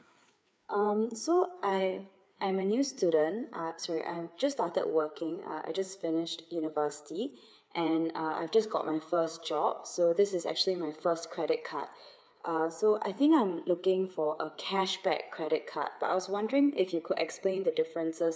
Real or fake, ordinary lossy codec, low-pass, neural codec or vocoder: fake; none; none; codec, 16 kHz, 4 kbps, FreqCodec, larger model